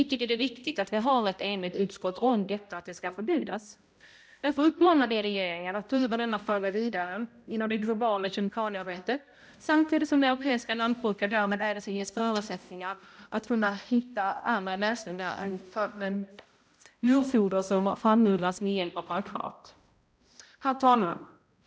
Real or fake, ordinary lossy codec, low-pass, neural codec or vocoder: fake; none; none; codec, 16 kHz, 0.5 kbps, X-Codec, HuBERT features, trained on balanced general audio